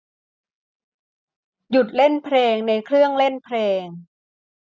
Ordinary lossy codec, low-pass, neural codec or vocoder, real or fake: none; none; none; real